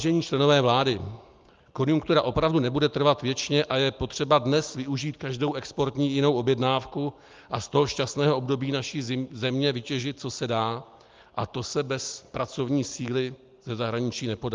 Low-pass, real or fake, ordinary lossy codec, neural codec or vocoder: 7.2 kHz; real; Opus, 32 kbps; none